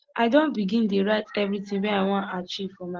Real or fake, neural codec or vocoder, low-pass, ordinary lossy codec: real; none; 7.2 kHz; Opus, 16 kbps